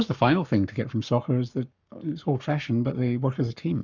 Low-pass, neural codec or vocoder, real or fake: 7.2 kHz; codec, 44.1 kHz, 7.8 kbps, Pupu-Codec; fake